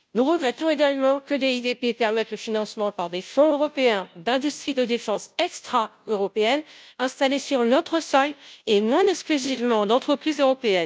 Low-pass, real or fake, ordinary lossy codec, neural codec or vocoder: none; fake; none; codec, 16 kHz, 0.5 kbps, FunCodec, trained on Chinese and English, 25 frames a second